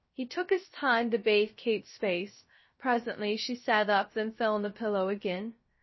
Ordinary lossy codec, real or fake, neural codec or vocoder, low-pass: MP3, 24 kbps; fake; codec, 16 kHz, 0.3 kbps, FocalCodec; 7.2 kHz